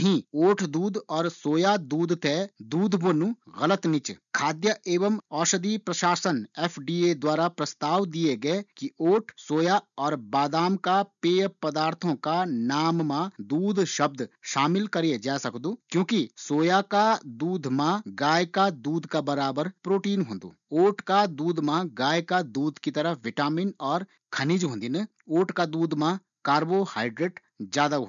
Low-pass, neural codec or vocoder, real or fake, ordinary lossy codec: 7.2 kHz; none; real; none